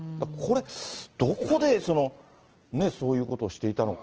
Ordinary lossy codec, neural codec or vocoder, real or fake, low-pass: Opus, 16 kbps; none; real; 7.2 kHz